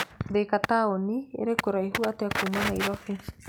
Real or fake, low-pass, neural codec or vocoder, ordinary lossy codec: real; none; none; none